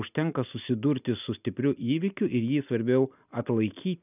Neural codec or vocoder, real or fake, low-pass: none; real; 3.6 kHz